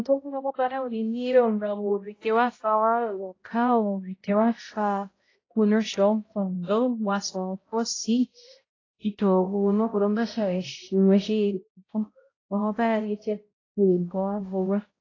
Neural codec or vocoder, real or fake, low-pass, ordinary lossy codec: codec, 16 kHz, 0.5 kbps, X-Codec, HuBERT features, trained on balanced general audio; fake; 7.2 kHz; AAC, 32 kbps